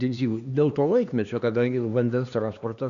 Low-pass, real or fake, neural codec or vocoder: 7.2 kHz; fake; codec, 16 kHz, 2 kbps, X-Codec, HuBERT features, trained on LibriSpeech